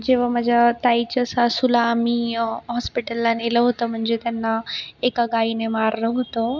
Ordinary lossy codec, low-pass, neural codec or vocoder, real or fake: none; 7.2 kHz; none; real